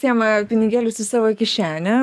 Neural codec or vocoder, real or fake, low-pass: codec, 44.1 kHz, 7.8 kbps, DAC; fake; 14.4 kHz